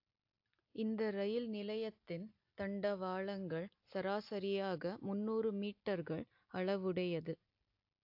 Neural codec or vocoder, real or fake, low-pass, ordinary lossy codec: none; real; 5.4 kHz; AAC, 48 kbps